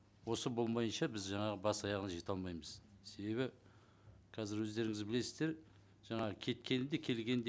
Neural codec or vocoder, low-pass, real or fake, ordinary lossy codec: none; none; real; none